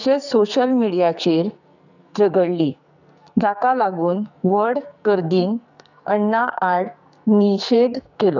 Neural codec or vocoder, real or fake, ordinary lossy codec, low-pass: codec, 44.1 kHz, 2.6 kbps, SNAC; fake; none; 7.2 kHz